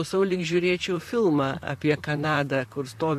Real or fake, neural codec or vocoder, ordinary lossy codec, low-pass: fake; vocoder, 44.1 kHz, 128 mel bands, Pupu-Vocoder; MP3, 64 kbps; 14.4 kHz